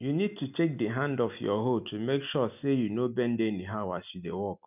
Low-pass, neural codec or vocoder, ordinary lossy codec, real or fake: 3.6 kHz; none; none; real